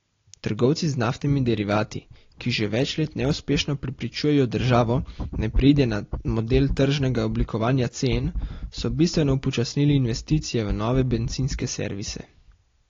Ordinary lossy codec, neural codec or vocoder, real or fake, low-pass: AAC, 32 kbps; none; real; 7.2 kHz